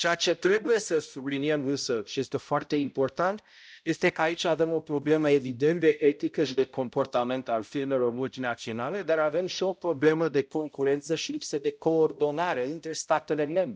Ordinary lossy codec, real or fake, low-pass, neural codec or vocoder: none; fake; none; codec, 16 kHz, 0.5 kbps, X-Codec, HuBERT features, trained on balanced general audio